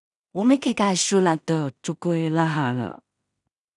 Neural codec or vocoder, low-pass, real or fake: codec, 16 kHz in and 24 kHz out, 0.4 kbps, LongCat-Audio-Codec, two codebook decoder; 10.8 kHz; fake